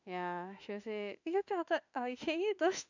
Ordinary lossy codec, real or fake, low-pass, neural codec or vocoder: none; fake; 7.2 kHz; autoencoder, 48 kHz, 32 numbers a frame, DAC-VAE, trained on Japanese speech